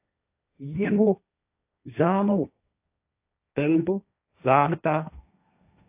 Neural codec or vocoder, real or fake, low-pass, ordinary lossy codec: codec, 16 kHz, 1.1 kbps, Voila-Tokenizer; fake; 3.6 kHz; AAC, 32 kbps